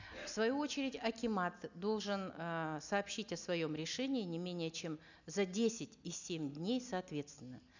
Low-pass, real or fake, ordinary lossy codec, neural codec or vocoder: 7.2 kHz; real; none; none